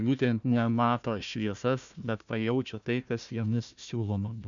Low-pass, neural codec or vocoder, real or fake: 7.2 kHz; codec, 16 kHz, 1 kbps, FunCodec, trained on Chinese and English, 50 frames a second; fake